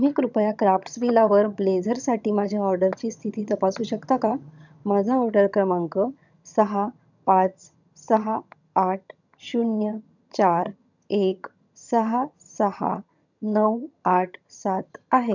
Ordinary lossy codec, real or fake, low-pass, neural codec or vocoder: none; fake; 7.2 kHz; vocoder, 22.05 kHz, 80 mel bands, HiFi-GAN